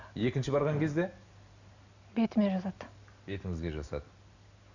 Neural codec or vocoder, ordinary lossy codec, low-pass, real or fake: none; none; 7.2 kHz; real